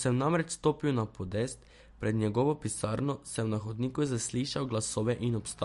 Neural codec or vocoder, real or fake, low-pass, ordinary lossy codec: none; real; 14.4 kHz; MP3, 48 kbps